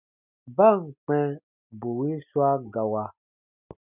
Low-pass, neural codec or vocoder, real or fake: 3.6 kHz; none; real